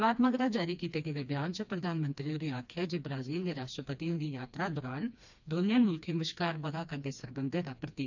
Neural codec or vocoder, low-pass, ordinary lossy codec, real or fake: codec, 16 kHz, 2 kbps, FreqCodec, smaller model; 7.2 kHz; none; fake